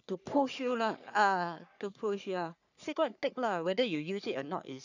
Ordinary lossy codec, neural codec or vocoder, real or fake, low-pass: none; codec, 44.1 kHz, 3.4 kbps, Pupu-Codec; fake; 7.2 kHz